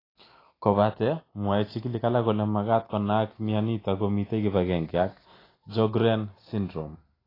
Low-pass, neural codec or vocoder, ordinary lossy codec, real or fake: 5.4 kHz; none; AAC, 24 kbps; real